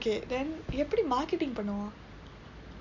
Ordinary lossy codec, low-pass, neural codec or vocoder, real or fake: none; 7.2 kHz; none; real